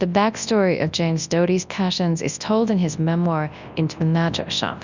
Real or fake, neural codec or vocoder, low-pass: fake; codec, 24 kHz, 0.9 kbps, WavTokenizer, large speech release; 7.2 kHz